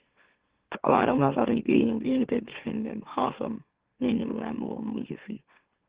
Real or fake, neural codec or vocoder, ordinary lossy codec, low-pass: fake; autoencoder, 44.1 kHz, a latent of 192 numbers a frame, MeloTTS; Opus, 16 kbps; 3.6 kHz